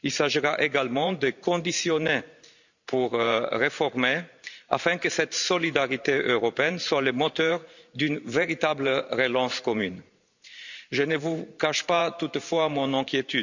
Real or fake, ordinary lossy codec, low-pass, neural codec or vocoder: fake; none; 7.2 kHz; vocoder, 44.1 kHz, 128 mel bands every 512 samples, BigVGAN v2